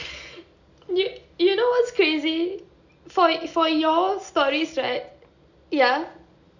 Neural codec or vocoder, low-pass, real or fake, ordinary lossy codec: none; 7.2 kHz; real; none